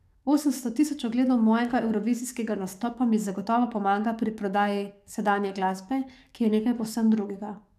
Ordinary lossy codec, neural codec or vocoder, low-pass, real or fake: none; codec, 44.1 kHz, 7.8 kbps, DAC; 14.4 kHz; fake